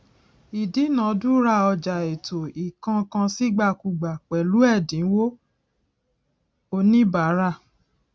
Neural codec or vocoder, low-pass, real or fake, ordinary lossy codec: none; none; real; none